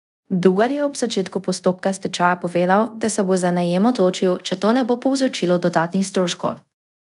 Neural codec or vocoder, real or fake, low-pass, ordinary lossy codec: codec, 24 kHz, 0.5 kbps, DualCodec; fake; 10.8 kHz; none